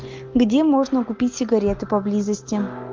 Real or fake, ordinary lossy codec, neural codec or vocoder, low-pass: real; Opus, 24 kbps; none; 7.2 kHz